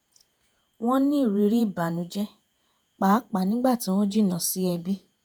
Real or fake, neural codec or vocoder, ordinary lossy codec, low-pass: fake; vocoder, 48 kHz, 128 mel bands, Vocos; none; none